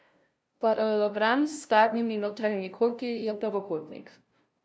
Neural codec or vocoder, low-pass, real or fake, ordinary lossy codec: codec, 16 kHz, 0.5 kbps, FunCodec, trained on LibriTTS, 25 frames a second; none; fake; none